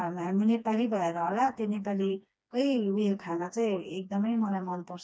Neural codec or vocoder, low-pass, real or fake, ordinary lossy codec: codec, 16 kHz, 2 kbps, FreqCodec, smaller model; none; fake; none